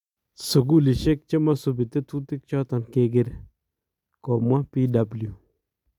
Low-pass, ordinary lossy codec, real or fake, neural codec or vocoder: 19.8 kHz; none; real; none